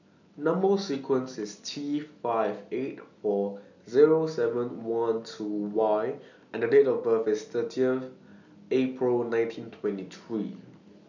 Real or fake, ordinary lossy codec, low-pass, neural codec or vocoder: real; none; 7.2 kHz; none